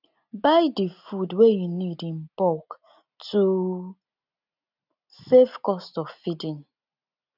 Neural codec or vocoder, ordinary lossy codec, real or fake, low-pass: none; none; real; 5.4 kHz